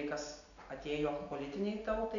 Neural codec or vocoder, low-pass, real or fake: none; 7.2 kHz; real